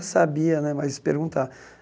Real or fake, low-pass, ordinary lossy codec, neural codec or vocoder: real; none; none; none